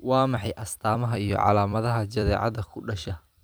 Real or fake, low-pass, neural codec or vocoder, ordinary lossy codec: fake; none; vocoder, 44.1 kHz, 128 mel bands every 256 samples, BigVGAN v2; none